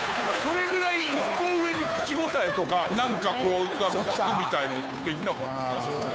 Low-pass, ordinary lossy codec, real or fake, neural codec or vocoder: none; none; fake; codec, 16 kHz, 2 kbps, FunCodec, trained on Chinese and English, 25 frames a second